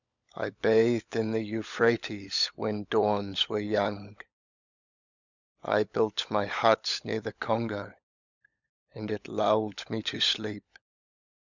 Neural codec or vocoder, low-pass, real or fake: codec, 16 kHz, 16 kbps, FunCodec, trained on LibriTTS, 50 frames a second; 7.2 kHz; fake